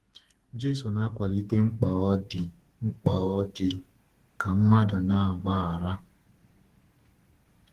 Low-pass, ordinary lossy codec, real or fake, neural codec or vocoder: 14.4 kHz; Opus, 16 kbps; fake; codec, 32 kHz, 1.9 kbps, SNAC